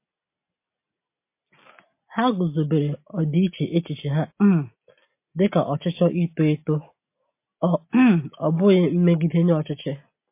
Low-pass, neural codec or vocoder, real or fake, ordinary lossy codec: 3.6 kHz; none; real; MP3, 24 kbps